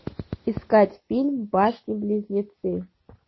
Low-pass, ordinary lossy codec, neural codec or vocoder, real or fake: 7.2 kHz; MP3, 24 kbps; none; real